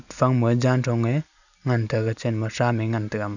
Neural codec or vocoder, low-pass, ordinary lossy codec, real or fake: none; 7.2 kHz; none; real